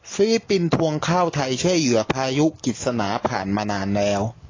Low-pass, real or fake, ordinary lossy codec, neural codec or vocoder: 7.2 kHz; real; AAC, 32 kbps; none